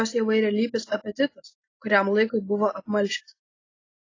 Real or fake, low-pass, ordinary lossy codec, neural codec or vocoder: real; 7.2 kHz; AAC, 32 kbps; none